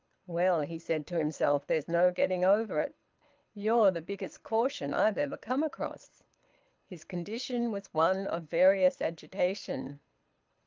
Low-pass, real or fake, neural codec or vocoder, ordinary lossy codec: 7.2 kHz; fake; codec, 24 kHz, 6 kbps, HILCodec; Opus, 24 kbps